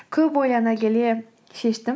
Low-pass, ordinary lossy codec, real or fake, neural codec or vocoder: none; none; real; none